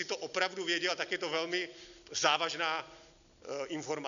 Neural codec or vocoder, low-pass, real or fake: none; 7.2 kHz; real